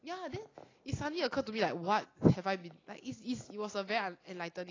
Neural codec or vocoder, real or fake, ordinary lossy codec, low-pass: none; real; AAC, 32 kbps; 7.2 kHz